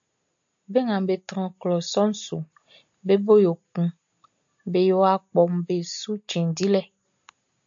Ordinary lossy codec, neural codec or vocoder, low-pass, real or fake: AAC, 64 kbps; none; 7.2 kHz; real